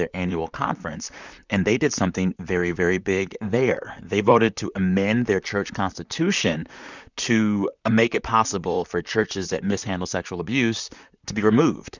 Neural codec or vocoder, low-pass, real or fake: vocoder, 44.1 kHz, 128 mel bands, Pupu-Vocoder; 7.2 kHz; fake